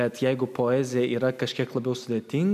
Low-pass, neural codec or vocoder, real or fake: 14.4 kHz; none; real